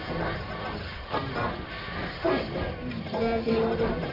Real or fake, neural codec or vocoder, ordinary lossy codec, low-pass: fake; codec, 44.1 kHz, 1.7 kbps, Pupu-Codec; AAC, 24 kbps; 5.4 kHz